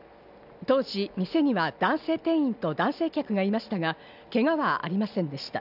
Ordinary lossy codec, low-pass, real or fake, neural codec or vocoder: none; 5.4 kHz; real; none